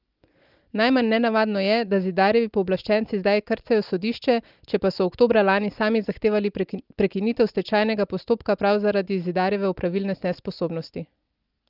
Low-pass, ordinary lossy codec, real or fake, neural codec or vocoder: 5.4 kHz; Opus, 24 kbps; real; none